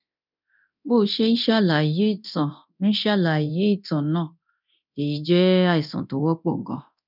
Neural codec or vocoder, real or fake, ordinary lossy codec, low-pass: codec, 24 kHz, 0.9 kbps, DualCodec; fake; none; 5.4 kHz